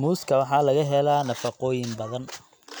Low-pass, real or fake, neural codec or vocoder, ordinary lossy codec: none; real; none; none